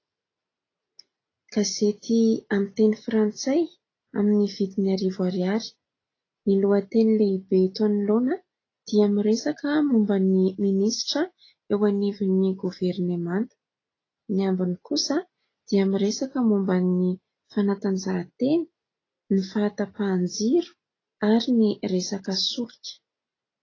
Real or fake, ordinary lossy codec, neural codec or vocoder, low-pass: real; AAC, 32 kbps; none; 7.2 kHz